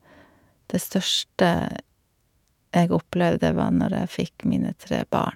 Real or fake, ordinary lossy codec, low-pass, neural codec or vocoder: fake; MP3, 96 kbps; 19.8 kHz; autoencoder, 48 kHz, 128 numbers a frame, DAC-VAE, trained on Japanese speech